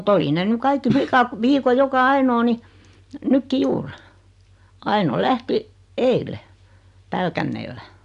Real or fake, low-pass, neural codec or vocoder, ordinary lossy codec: real; 10.8 kHz; none; MP3, 96 kbps